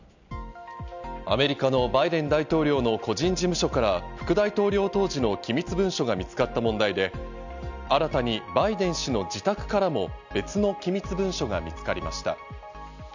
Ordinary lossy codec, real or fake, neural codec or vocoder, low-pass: none; real; none; 7.2 kHz